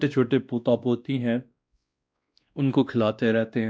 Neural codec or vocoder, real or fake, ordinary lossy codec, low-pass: codec, 16 kHz, 1 kbps, X-Codec, WavLM features, trained on Multilingual LibriSpeech; fake; none; none